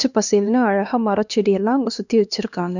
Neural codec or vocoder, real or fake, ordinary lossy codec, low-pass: codec, 16 kHz, 2 kbps, X-Codec, HuBERT features, trained on LibriSpeech; fake; none; 7.2 kHz